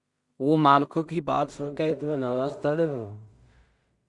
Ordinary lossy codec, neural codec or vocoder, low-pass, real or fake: Opus, 64 kbps; codec, 16 kHz in and 24 kHz out, 0.4 kbps, LongCat-Audio-Codec, two codebook decoder; 10.8 kHz; fake